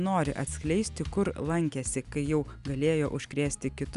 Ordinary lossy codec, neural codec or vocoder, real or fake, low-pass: Opus, 64 kbps; none; real; 10.8 kHz